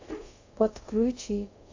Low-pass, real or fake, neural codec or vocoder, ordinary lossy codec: 7.2 kHz; fake; codec, 24 kHz, 0.5 kbps, DualCodec; AAC, 48 kbps